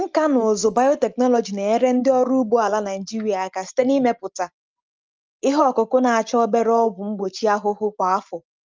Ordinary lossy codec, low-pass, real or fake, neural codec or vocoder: Opus, 24 kbps; 7.2 kHz; real; none